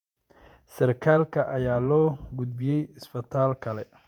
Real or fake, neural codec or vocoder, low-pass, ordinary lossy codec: fake; vocoder, 48 kHz, 128 mel bands, Vocos; 19.8 kHz; MP3, 96 kbps